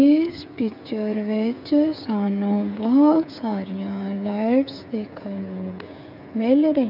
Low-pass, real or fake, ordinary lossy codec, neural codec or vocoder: 5.4 kHz; fake; none; codec, 16 kHz, 8 kbps, FreqCodec, smaller model